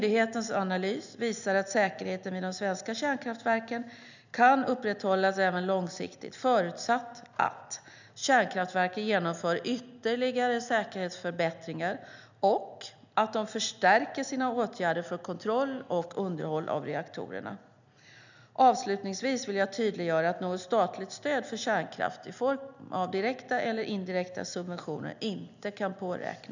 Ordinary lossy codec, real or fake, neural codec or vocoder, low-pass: none; real; none; 7.2 kHz